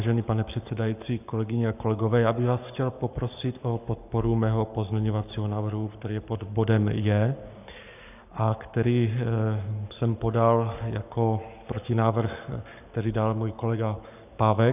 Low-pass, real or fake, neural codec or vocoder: 3.6 kHz; fake; codec, 44.1 kHz, 7.8 kbps, Pupu-Codec